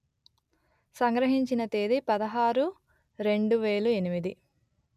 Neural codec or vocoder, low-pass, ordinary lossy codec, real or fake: none; 14.4 kHz; none; real